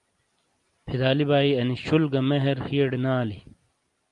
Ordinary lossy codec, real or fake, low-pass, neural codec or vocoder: Opus, 32 kbps; real; 10.8 kHz; none